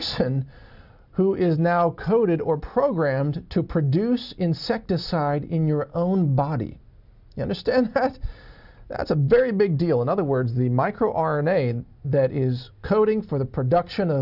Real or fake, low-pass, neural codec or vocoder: real; 5.4 kHz; none